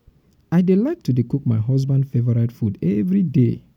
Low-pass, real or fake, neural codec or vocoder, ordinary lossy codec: 19.8 kHz; real; none; none